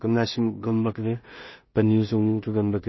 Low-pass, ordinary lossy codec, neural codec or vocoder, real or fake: 7.2 kHz; MP3, 24 kbps; codec, 16 kHz in and 24 kHz out, 0.4 kbps, LongCat-Audio-Codec, two codebook decoder; fake